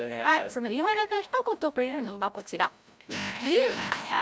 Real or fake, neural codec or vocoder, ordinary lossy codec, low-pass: fake; codec, 16 kHz, 0.5 kbps, FreqCodec, larger model; none; none